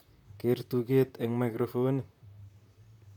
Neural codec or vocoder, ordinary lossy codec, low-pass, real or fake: vocoder, 44.1 kHz, 128 mel bands, Pupu-Vocoder; none; 19.8 kHz; fake